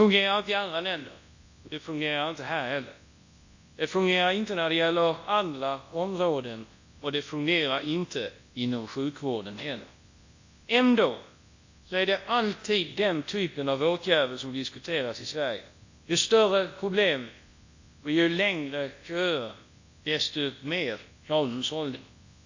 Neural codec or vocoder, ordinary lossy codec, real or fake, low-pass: codec, 24 kHz, 0.9 kbps, WavTokenizer, large speech release; AAC, 48 kbps; fake; 7.2 kHz